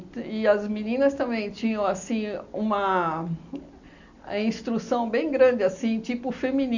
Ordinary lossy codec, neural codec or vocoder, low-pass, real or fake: none; none; 7.2 kHz; real